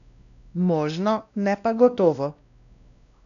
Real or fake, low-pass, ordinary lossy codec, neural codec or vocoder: fake; 7.2 kHz; none; codec, 16 kHz, 1 kbps, X-Codec, WavLM features, trained on Multilingual LibriSpeech